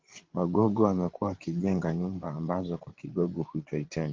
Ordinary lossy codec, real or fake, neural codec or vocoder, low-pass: Opus, 16 kbps; fake; codec, 16 kHz, 16 kbps, FreqCodec, larger model; 7.2 kHz